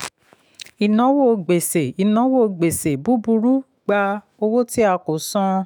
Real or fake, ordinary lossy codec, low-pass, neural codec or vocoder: fake; none; none; autoencoder, 48 kHz, 128 numbers a frame, DAC-VAE, trained on Japanese speech